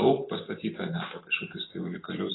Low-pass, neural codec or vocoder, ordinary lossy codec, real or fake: 7.2 kHz; none; AAC, 16 kbps; real